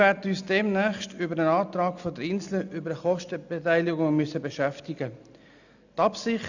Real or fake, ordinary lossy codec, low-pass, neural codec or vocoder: real; none; 7.2 kHz; none